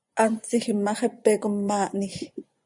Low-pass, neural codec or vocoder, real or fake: 10.8 kHz; vocoder, 24 kHz, 100 mel bands, Vocos; fake